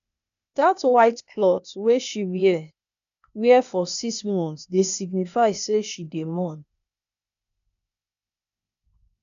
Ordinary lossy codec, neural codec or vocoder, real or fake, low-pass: none; codec, 16 kHz, 0.8 kbps, ZipCodec; fake; 7.2 kHz